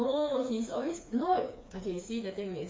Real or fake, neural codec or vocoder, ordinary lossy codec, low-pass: fake; codec, 16 kHz, 4 kbps, FreqCodec, smaller model; none; none